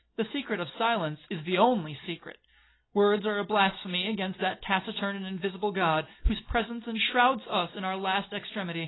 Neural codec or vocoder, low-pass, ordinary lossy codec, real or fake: none; 7.2 kHz; AAC, 16 kbps; real